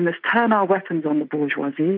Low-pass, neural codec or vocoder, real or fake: 5.4 kHz; none; real